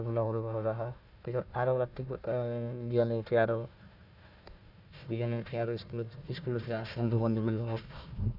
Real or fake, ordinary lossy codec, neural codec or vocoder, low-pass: fake; none; codec, 16 kHz, 1 kbps, FunCodec, trained on Chinese and English, 50 frames a second; 5.4 kHz